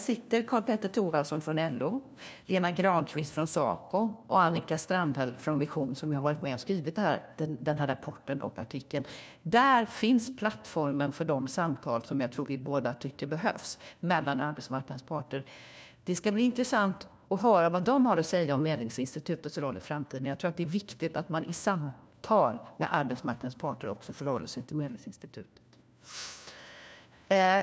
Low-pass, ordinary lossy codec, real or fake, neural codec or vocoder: none; none; fake; codec, 16 kHz, 1 kbps, FunCodec, trained on LibriTTS, 50 frames a second